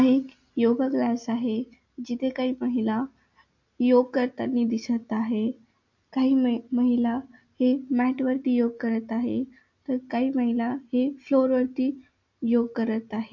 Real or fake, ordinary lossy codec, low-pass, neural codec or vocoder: real; MP3, 48 kbps; 7.2 kHz; none